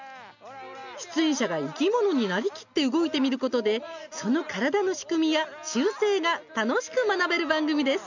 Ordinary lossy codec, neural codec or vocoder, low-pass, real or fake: none; none; 7.2 kHz; real